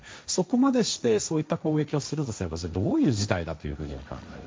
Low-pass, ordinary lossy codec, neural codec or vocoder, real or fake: none; none; codec, 16 kHz, 1.1 kbps, Voila-Tokenizer; fake